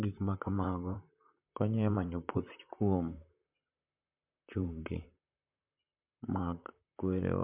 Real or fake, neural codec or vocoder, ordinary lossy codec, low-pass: fake; vocoder, 44.1 kHz, 128 mel bands, Pupu-Vocoder; MP3, 32 kbps; 3.6 kHz